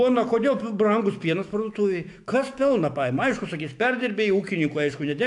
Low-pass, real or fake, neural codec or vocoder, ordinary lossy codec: 10.8 kHz; fake; autoencoder, 48 kHz, 128 numbers a frame, DAC-VAE, trained on Japanese speech; AAC, 64 kbps